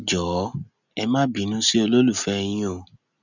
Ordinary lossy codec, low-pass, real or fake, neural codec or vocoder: none; 7.2 kHz; real; none